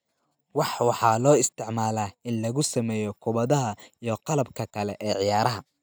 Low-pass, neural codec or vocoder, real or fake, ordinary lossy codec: none; none; real; none